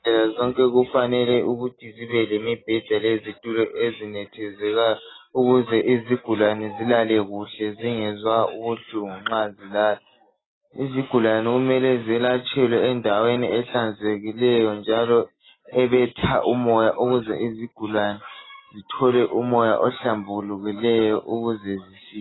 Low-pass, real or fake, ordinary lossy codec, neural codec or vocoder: 7.2 kHz; real; AAC, 16 kbps; none